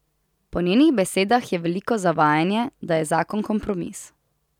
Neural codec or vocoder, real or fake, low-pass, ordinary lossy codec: none; real; 19.8 kHz; none